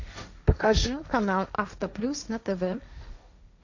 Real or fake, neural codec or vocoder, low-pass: fake; codec, 16 kHz, 1.1 kbps, Voila-Tokenizer; 7.2 kHz